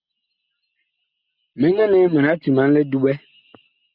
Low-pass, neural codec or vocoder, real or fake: 5.4 kHz; none; real